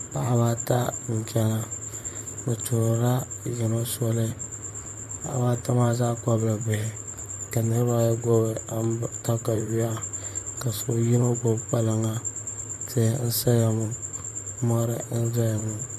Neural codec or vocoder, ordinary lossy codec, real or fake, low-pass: vocoder, 44.1 kHz, 128 mel bands, Pupu-Vocoder; MP3, 64 kbps; fake; 14.4 kHz